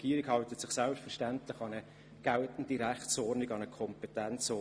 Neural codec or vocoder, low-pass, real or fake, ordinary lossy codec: none; none; real; none